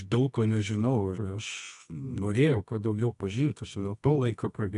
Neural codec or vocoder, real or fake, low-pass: codec, 24 kHz, 0.9 kbps, WavTokenizer, medium music audio release; fake; 10.8 kHz